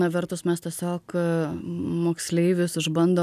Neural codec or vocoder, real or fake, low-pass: none; real; 14.4 kHz